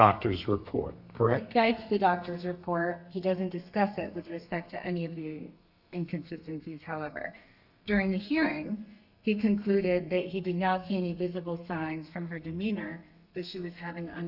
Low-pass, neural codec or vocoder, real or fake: 5.4 kHz; codec, 32 kHz, 1.9 kbps, SNAC; fake